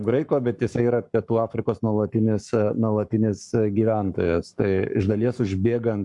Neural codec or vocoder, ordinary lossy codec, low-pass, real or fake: codec, 44.1 kHz, 7.8 kbps, Pupu-Codec; MP3, 96 kbps; 10.8 kHz; fake